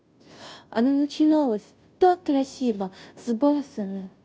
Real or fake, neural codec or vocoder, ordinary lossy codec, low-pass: fake; codec, 16 kHz, 0.5 kbps, FunCodec, trained on Chinese and English, 25 frames a second; none; none